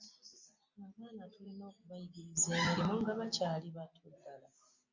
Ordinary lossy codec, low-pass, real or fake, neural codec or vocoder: MP3, 48 kbps; 7.2 kHz; real; none